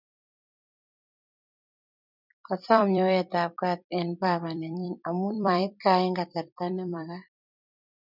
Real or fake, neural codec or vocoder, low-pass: fake; vocoder, 44.1 kHz, 128 mel bands every 256 samples, BigVGAN v2; 5.4 kHz